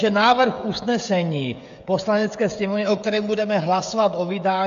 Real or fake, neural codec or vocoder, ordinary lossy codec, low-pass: fake; codec, 16 kHz, 16 kbps, FreqCodec, smaller model; AAC, 64 kbps; 7.2 kHz